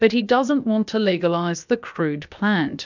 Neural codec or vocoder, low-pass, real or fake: codec, 16 kHz, about 1 kbps, DyCAST, with the encoder's durations; 7.2 kHz; fake